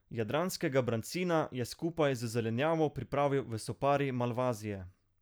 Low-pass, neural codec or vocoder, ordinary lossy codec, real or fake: none; none; none; real